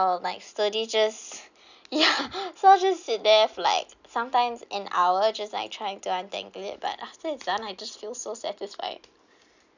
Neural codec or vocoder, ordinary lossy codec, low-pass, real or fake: none; none; 7.2 kHz; real